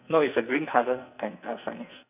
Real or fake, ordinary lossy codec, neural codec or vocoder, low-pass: fake; none; codec, 44.1 kHz, 2.6 kbps, SNAC; 3.6 kHz